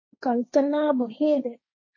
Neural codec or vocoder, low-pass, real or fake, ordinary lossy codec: codec, 16 kHz, 1.1 kbps, Voila-Tokenizer; 7.2 kHz; fake; MP3, 32 kbps